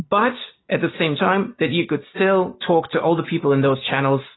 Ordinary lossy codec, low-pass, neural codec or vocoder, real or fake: AAC, 16 kbps; 7.2 kHz; none; real